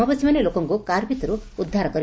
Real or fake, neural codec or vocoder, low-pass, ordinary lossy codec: real; none; none; none